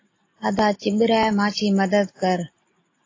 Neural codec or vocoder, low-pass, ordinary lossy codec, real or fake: none; 7.2 kHz; AAC, 32 kbps; real